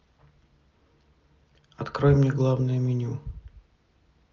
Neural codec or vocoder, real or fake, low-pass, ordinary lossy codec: none; real; 7.2 kHz; Opus, 24 kbps